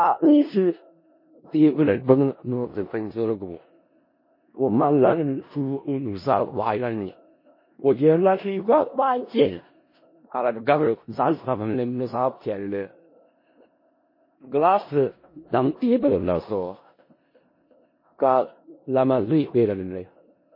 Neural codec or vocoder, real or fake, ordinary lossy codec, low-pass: codec, 16 kHz in and 24 kHz out, 0.4 kbps, LongCat-Audio-Codec, four codebook decoder; fake; MP3, 24 kbps; 5.4 kHz